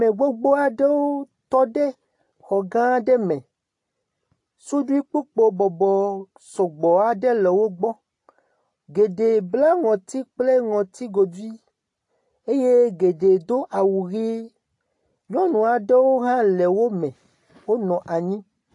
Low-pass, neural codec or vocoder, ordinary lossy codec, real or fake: 10.8 kHz; none; AAC, 48 kbps; real